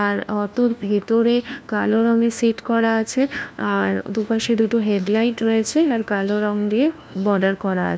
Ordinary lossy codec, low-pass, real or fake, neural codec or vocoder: none; none; fake; codec, 16 kHz, 1 kbps, FunCodec, trained on LibriTTS, 50 frames a second